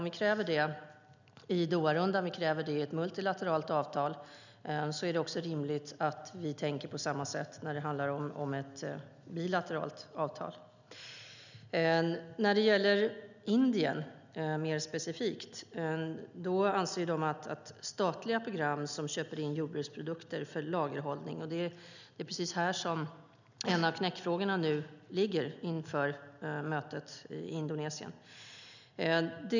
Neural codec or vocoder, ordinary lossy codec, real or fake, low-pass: none; none; real; 7.2 kHz